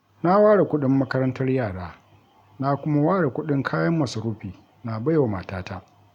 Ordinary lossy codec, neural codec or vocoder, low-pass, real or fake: none; none; 19.8 kHz; real